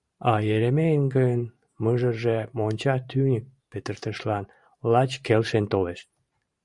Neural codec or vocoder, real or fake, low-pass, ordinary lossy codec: vocoder, 44.1 kHz, 128 mel bands every 512 samples, BigVGAN v2; fake; 10.8 kHz; Opus, 64 kbps